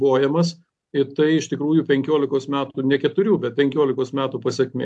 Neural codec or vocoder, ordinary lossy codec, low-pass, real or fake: none; AAC, 64 kbps; 10.8 kHz; real